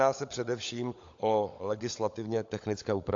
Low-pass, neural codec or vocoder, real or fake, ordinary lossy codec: 7.2 kHz; codec, 16 kHz, 16 kbps, FunCodec, trained on LibriTTS, 50 frames a second; fake; AAC, 48 kbps